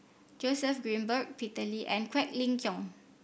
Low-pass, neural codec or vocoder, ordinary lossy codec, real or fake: none; none; none; real